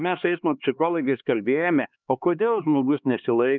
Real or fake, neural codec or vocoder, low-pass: fake; codec, 16 kHz, 2 kbps, X-Codec, HuBERT features, trained on LibriSpeech; 7.2 kHz